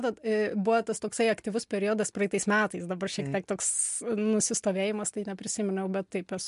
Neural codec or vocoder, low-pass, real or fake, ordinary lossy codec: none; 10.8 kHz; real; MP3, 64 kbps